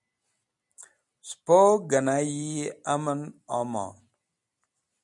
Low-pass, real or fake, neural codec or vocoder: 10.8 kHz; real; none